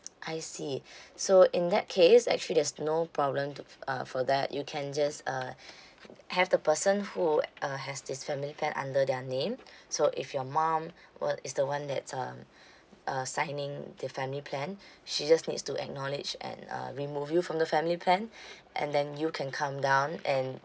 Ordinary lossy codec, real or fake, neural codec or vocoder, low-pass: none; real; none; none